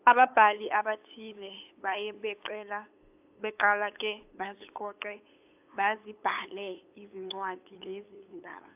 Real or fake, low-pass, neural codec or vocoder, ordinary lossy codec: fake; 3.6 kHz; codec, 16 kHz, 8 kbps, FunCodec, trained on LibriTTS, 25 frames a second; AAC, 32 kbps